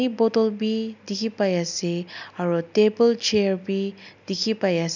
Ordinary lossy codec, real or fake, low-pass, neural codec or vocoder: none; real; 7.2 kHz; none